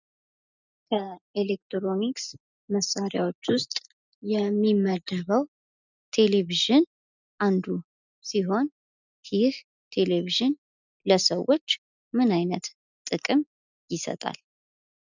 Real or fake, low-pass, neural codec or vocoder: real; 7.2 kHz; none